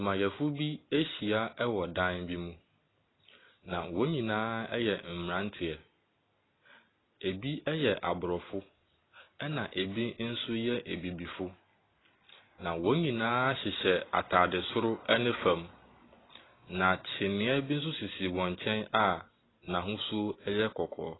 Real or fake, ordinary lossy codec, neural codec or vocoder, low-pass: real; AAC, 16 kbps; none; 7.2 kHz